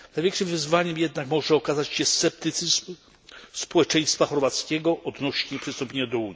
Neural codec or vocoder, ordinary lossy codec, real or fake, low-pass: none; none; real; none